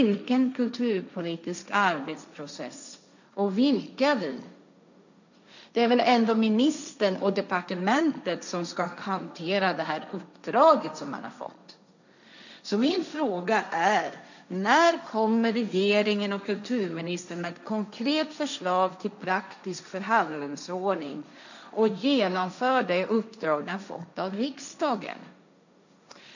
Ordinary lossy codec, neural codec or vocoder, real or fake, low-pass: none; codec, 16 kHz, 1.1 kbps, Voila-Tokenizer; fake; 7.2 kHz